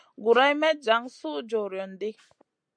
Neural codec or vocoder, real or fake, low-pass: none; real; 9.9 kHz